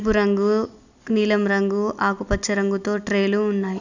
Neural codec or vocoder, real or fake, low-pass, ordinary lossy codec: none; real; 7.2 kHz; none